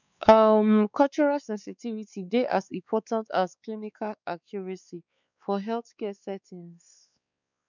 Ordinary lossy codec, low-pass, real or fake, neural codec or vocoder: none; 7.2 kHz; fake; codec, 16 kHz, 4 kbps, X-Codec, WavLM features, trained on Multilingual LibriSpeech